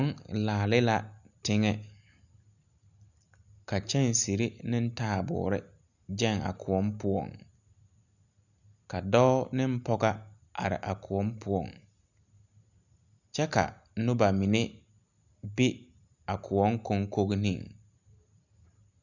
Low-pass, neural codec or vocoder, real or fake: 7.2 kHz; none; real